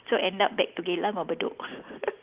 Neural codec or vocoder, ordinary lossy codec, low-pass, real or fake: none; Opus, 32 kbps; 3.6 kHz; real